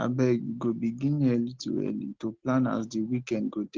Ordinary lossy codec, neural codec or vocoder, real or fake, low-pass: Opus, 16 kbps; none; real; 7.2 kHz